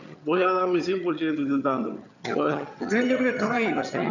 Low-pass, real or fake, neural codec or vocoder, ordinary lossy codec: 7.2 kHz; fake; vocoder, 22.05 kHz, 80 mel bands, HiFi-GAN; none